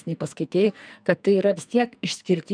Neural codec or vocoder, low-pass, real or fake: codec, 44.1 kHz, 2.6 kbps, SNAC; 9.9 kHz; fake